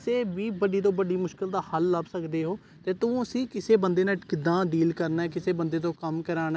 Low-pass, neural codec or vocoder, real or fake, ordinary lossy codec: none; none; real; none